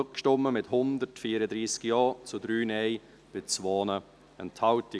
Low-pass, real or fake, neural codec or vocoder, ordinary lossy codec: none; real; none; none